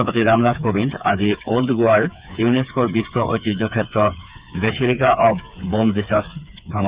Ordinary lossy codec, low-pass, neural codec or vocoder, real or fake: Opus, 64 kbps; 3.6 kHz; codec, 16 kHz, 4 kbps, FreqCodec, smaller model; fake